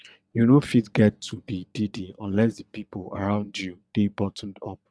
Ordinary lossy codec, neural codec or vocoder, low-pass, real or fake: none; vocoder, 22.05 kHz, 80 mel bands, WaveNeXt; none; fake